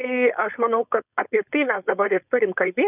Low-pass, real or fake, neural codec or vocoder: 3.6 kHz; fake; codec, 16 kHz, 4.8 kbps, FACodec